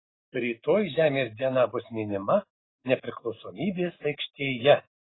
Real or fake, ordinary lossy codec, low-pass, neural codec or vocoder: real; AAC, 16 kbps; 7.2 kHz; none